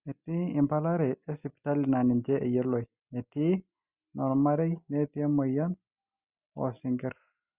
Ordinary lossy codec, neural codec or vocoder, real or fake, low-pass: Opus, 64 kbps; none; real; 3.6 kHz